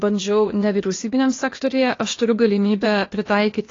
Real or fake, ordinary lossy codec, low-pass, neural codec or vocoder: fake; AAC, 32 kbps; 7.2 kHz; codec, 16 kHz, 0.8 kbps, ZipCodec